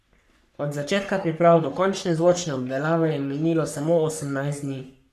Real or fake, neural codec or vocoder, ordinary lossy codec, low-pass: fake; codec, 44.1 kHz, 3.4 kbps, Pupu-Codec; none; 14.4 kHz